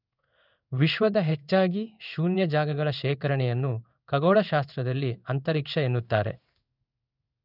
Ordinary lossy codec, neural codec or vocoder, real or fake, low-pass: none; codec, 16 kHz in and 24 kHz out, 1 kbps, XY-Tokenizer; fake; 5.4 kHz